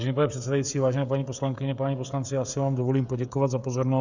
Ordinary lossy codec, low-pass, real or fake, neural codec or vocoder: Opus, 64 kbps; 7.2 kHz; fake; codec, 16 kHz, 16 kbps, FreqCodec, smaller model